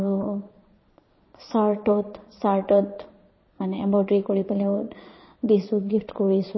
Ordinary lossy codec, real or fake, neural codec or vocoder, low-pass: MP3, 24 kbps; fake; vocoder, 22.05 kHz, 80 mel bands, Vocos; 7.2 kHz